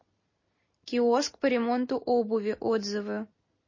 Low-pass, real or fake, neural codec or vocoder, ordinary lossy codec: 7.2 kHz; real; none; MP3, 32 kbps